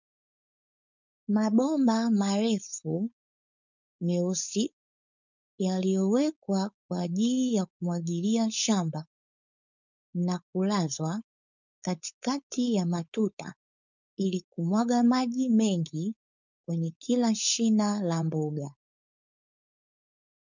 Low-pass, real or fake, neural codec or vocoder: 7.2 kHz; fake; codec, 16 kHz, 4.8 kbps, FACodec